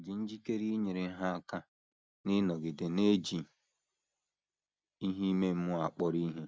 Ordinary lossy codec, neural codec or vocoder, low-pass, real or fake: none; none; none; real